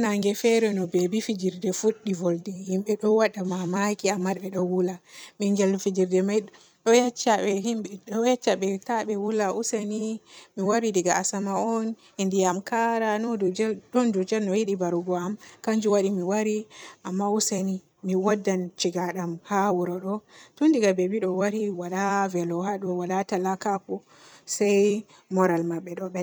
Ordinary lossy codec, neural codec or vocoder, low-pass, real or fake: none; vocoder, 44.1 kHz, 128 mel bands every 256 samples, BigVGAN v2; none; fake